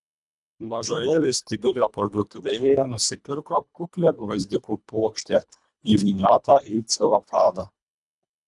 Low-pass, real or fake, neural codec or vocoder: 10.8 kHz; fake; codec, 24 kHz, 1.5 kbps, HILCodec